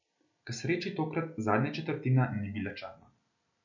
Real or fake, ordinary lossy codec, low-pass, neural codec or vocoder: real; none; 7.2 kHz; none